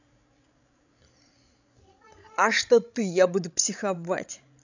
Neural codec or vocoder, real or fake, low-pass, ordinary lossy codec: codec, 16 kHz, 16 kbps, FreqCodec, larger model; fake; 7.2 kHz; none